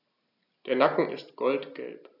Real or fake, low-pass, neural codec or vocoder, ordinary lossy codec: real; 5.4 kHz; none; none